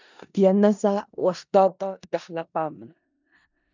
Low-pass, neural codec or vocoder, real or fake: 7.2 kHz; codec, 16 kHz in and 24 kHz out, 0.4 kbps, LongCat-Audio-Codec, four codebook decoder; fake